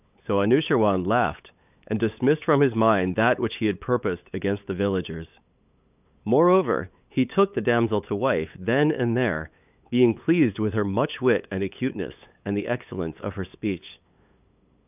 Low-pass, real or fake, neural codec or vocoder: 3.6 kHz; fake; codec, 16 kHz, 8 kbps, FunCodec, trained on LibriTTS, 25 frames a second